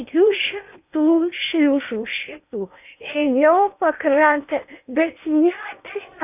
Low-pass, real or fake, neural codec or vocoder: 3.6 kHz; fake; codec, 16 kHz in and 24 kHz out, 0.8 kbps, FocalCodec, streaming, 65536 codes